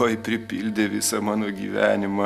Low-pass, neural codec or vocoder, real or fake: 14.4 kHz; none; real